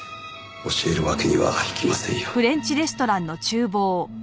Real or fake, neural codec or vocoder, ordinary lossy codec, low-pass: real; none; none; none